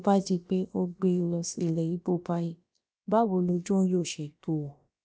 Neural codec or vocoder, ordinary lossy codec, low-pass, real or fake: codec, 16 kHz, about 1 kbps, DyCAST, with the encoder's durations; none; none; fake